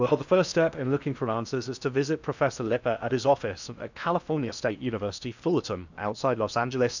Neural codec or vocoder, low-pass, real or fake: codec, 16 kHz in and 24 kHz out, 0.8 kbps, FocalCodec, streaming, 65536 codes; 7.2 kHz; fake